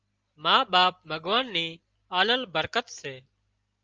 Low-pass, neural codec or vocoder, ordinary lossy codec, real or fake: 7.2 kHz; none; Opus, 24 kbps; real